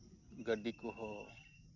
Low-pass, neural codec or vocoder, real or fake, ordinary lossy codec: 7.2 kHz; none; real; none